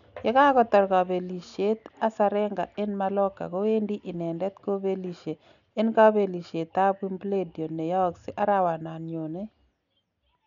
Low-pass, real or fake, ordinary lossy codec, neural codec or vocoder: 7.2 kHz; real; none; none